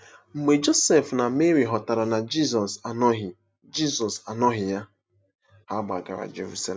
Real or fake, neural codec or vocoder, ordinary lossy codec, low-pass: real; none; none; none